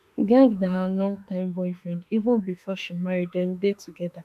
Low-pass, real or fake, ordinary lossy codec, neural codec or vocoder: 14.4 kHz; fake; none; autoencoder, 48 kHz, 32 numbers a frame, DAC-VAE, trained on Japanese speech